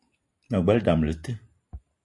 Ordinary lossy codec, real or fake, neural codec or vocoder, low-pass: MP3, 48 kbps; real; none; 10.8 kHz